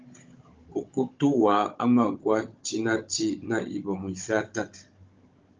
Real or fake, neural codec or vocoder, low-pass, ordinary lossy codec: fake; codec, 16 kHz, 16 kbps, FunCodec, trained on Chinese and English, 50 frames a second; 7.2 kHz; Opus, 24 kbps